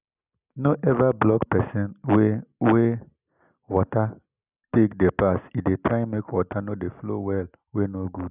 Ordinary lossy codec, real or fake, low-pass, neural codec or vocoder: none; real; 3.6 kHz; none